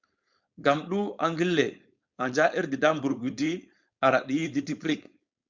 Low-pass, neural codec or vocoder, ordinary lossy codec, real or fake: 7.2 kHz; codec, 16 kHz, 4.8 kbps, FACodec; Opus, 64 kbps; fake